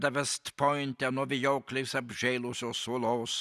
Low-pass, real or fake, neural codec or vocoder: 14.4 kHz; real; none